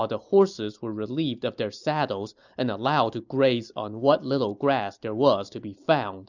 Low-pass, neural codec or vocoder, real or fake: 7.2 kHz; none; real